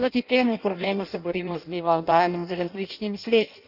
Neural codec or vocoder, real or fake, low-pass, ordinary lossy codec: codec, 16 kHz in and 24 kHz out, 0.6 kbps, FireRedTTS-2 codec; fake; 5.4 kHz; none